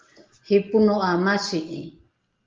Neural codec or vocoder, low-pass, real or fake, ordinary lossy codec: none; 7.2 kHz; real; Opus, 32 kbps